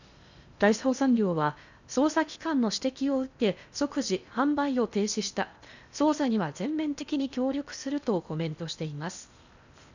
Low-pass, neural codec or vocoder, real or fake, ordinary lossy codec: 7.2 kHz; codec, 16 kHz in and 24 kHz out, 0.8 kbps, FocalCodec, streaming, 65536 codes; fake; none